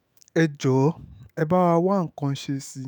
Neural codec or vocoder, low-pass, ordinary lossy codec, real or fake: autoencoder, 48 kHz, 128 numbers a frame, DAC-VAE, trained on Japanese speech; none; none; fake